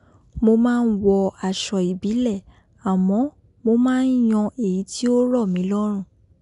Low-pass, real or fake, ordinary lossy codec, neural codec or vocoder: 10.8 kHz; real; none; none